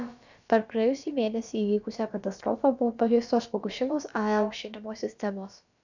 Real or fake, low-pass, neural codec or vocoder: fake; 7.2 kHz; codec, 16 kHz, about 1 kbps, DyCAST, with the encoder's durations